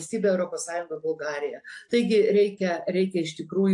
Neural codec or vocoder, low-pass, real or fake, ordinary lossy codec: none; 10.8 kHz; real; AAC, 64 kbps